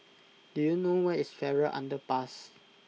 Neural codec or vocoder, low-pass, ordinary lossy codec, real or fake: none; none; none; real